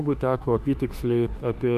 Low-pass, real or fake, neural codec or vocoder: 14.4 kHz; fake; autoencoder, 48 kHz, 32 numbers a frame, DAC-VAE, trained on Japanese speech